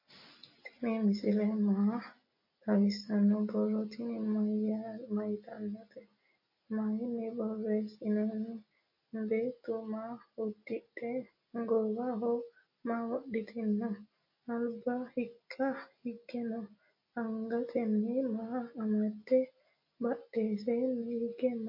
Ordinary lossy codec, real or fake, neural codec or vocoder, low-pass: MP3, 32 kbps; real; none; 5.4 kHz